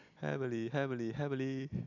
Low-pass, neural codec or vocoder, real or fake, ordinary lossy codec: 7.2 kHz; none; real; none